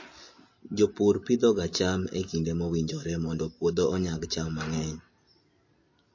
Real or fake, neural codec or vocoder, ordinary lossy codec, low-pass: real; none; MP3, 32 kbps; 7.2 kHz